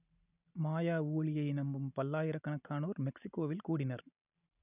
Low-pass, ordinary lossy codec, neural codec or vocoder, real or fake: 3.6 kHz; none; none; real